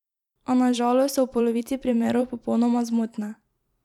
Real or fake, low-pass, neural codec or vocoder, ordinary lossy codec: fake; 19.8 kHz; vocoder, 44.1 kHz, 128 mel bands every 512 samples, BigVGAN v2; none